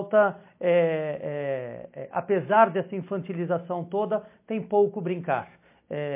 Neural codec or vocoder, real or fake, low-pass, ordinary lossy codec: none; real; 3.6 kHz; MP3, 24 kbps